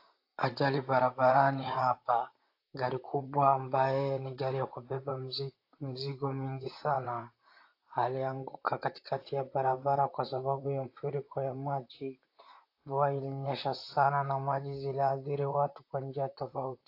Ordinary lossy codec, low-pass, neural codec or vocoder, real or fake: AAC, 32 kbps; 5.4 kHz; vocoder, 44.1 kHz, 128 mel bands, Pupu-Vocoder; fake